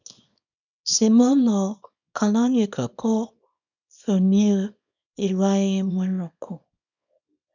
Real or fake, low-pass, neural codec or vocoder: fake; 7.2 kHz; codec, 24 kHz, 0.9 kbps, WavTokenizer, small release